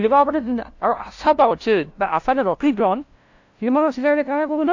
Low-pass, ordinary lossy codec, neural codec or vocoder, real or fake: 7.2 kHz; AAC, 48 kbps; codec, 16 kHz, 0.5 kbps, FunCodec, trained on LibriTTS, 25 frames a second; fake